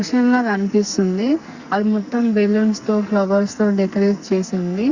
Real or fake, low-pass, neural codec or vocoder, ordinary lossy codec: fake; 7.2 kHz; codec, 44.1 kHz, 2.6 kbps, SNAC; Opus, 64 kbps